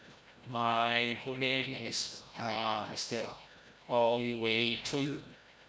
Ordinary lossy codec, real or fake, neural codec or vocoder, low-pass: none; fake; codec, 16 kHz, 0.5 kbps, FreqCodec, larger model; none